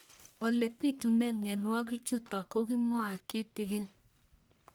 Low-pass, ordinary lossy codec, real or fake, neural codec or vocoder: none; none; fake; codec, 44.1 kHz, 1.7 kbps, Pupu-Codec